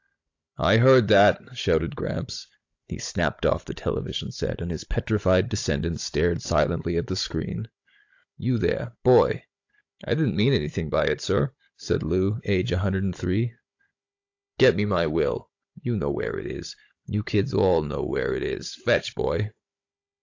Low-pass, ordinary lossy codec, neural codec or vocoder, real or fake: 7.2 kHz; AAC, 48 kbps; codec, 16 kHz, 16 kbps, FunCodec, trained on Chinese and English, 50 frames a second; fake